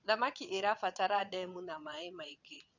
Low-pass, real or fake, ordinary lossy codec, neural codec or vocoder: 7.2 kHz; fake; none; vocoder, 22.05 kHz, 80 mel bands, WaveNeXt